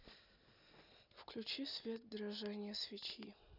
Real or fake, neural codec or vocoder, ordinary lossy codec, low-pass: real; none; none; 5.4 kHz